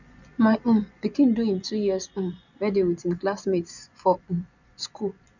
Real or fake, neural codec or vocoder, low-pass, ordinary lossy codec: fake; vocoder, 24 kHz, 100 mel bands, Vocos; 7.2 kHz; none